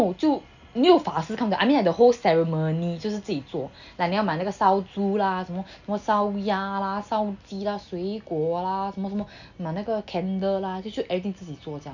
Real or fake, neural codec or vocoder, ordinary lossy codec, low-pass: real; none; none; 7.2 kHz